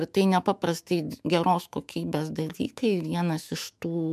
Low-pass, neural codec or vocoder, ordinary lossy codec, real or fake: 14.4 kHz; autoencoder, 48 kHz, 128 numbers a frame, DAC-VAE, trained on Japanese speech; MP3, 96 kbps; fake